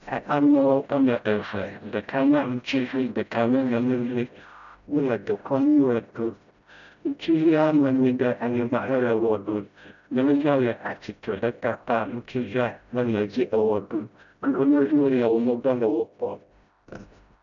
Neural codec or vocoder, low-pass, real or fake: codec, 16 kHz, 0.5 kbps, FreqCodec, smaller model; 7.2 kHz; fake